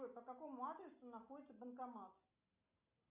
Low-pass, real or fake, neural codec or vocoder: 3.6 kHz; real; none